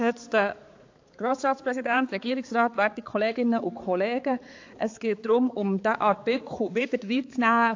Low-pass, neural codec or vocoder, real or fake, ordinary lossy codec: 7.2 kHz; codec, 16 kHz, 4 kbps, X-Codec, HuBERT features, trained on balanced general audio; fake; AAC, 48 kbps